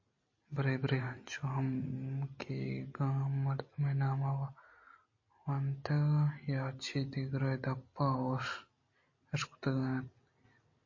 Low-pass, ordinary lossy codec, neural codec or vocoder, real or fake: 7.2 kHz; MP3, 32 kbps; none; real